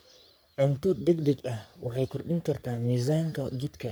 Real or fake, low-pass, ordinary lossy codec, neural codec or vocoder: fake; none; none; codec, 44.1 kHz, 3.4 kbps, Pupu-Codec